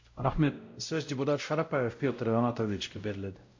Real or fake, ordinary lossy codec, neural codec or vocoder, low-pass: fake; MP3, 48 kbps; codec, 16 kHz, 0.5 kbps, X-Codec, WavLM features, trained on Multilingual LibriSpeech; 7.2 kHz